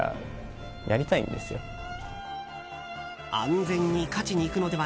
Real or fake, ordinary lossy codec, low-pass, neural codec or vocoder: real; none; none; none